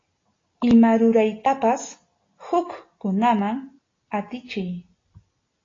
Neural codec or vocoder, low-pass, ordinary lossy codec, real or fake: none; 7.2 kHz; AAC, 32 kbps; real